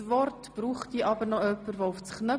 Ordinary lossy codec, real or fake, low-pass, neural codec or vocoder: none; real; none; none